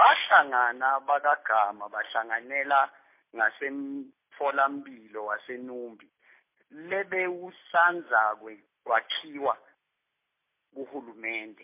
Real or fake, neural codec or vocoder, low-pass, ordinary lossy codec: real; none; 3.6 kHz; MP3, 24 kbps